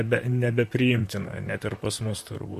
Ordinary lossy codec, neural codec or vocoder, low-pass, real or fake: MP3, 64 kbps; vocoder, 44.1 kHz, 128 mel bands, Pupu-Vocoder; 14.4 kHz; fake